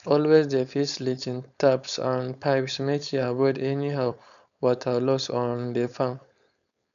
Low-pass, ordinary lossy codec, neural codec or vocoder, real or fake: 7.2 kHz; none; codec, 16 kHz, 4.8 kbps, FACodec; fake